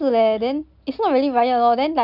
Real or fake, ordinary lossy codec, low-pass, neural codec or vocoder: real; none; 5.4 kHz; none